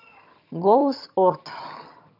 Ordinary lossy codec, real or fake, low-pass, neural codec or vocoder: none; fake; 5.4 kHz; vocoder, 22.05 kHz, 80 mel bands, HiFi-GAN